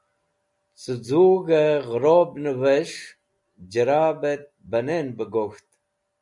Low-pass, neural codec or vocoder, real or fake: 10.8 kHz; none; real